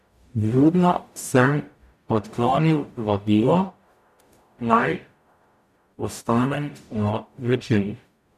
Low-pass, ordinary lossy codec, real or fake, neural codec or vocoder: 14.4 kHz; none; fake; codec, 44.1 kHz, 0.9 kbps, DAC